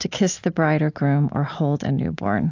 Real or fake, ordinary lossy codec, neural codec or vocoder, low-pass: real; AAC, 48 kbps; none; 7.2 kHz